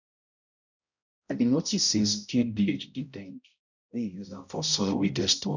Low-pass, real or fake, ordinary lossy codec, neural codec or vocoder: 7.2 kHz; fake; none; codec, 16 kHz, 0.5 kbps, X-Codec, HuBERT features, trained on balanced general audio